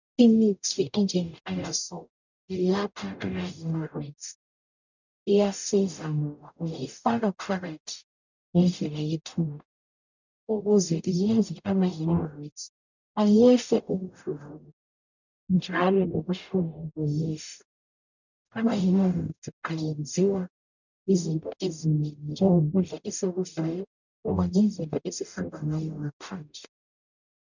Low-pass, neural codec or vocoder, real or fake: 7.2 kHz; codec, 44.1 kHz, 0.9 kbps, DAC; fake